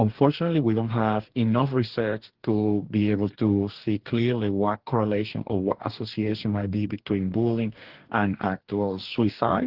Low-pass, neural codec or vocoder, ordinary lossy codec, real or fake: 5.4 kHz; codec, 44.1 kHz, 2.6 kbps, DAC; Opus, 16 kbps; fake